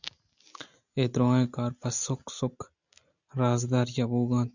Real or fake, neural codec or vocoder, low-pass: real; none; 7.2 kHz